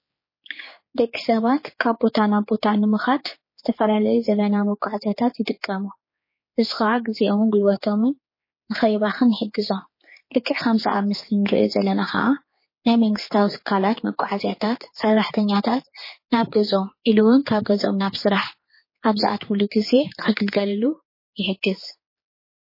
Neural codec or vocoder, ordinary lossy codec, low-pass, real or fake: codec, 16 kHz, 4 kbps, X-Codec, HuBERT features, trained on general audio; MP3, 24 kbps; 5.4 kHz; fake